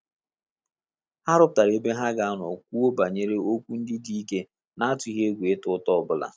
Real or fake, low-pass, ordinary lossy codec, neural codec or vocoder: real; none; none; none